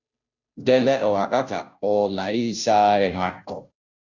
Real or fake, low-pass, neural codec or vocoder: fake; 7.2 kHz; codec, 16 kHz, 0.5 kbps, FunCodec, trained on Chinese and English, 25 frames a second